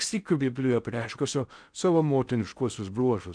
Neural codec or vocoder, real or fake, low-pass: codec, 16 kHz in and 24 kHz out, 0.6 kbps, FocalCodec, streaming, 4096 codes; fake; 9.9 kHz